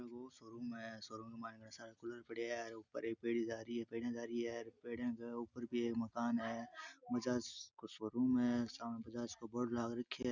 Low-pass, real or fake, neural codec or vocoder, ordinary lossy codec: 7.2 kHz; real; none; none